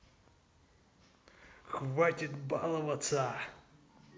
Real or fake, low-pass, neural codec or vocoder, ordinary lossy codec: real; none; none; none